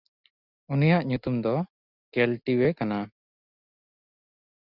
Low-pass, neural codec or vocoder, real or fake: 5.4 kHz; none; real